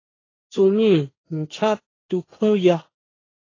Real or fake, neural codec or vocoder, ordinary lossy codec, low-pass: fake; codec, 16 kHz, 1.1 kbps, Voila-Tokenizer; AAC, 32 kbps; 7.2 kHz